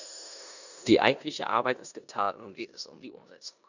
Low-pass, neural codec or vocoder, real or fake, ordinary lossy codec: 7.2 kHz; codec, 16 kHz in and 24 kHz out, 0.9 kbps, LongCat-Audio-Codec, four codebook decoder; fake; none